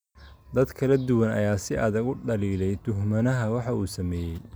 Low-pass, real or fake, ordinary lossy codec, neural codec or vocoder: none; real; none; none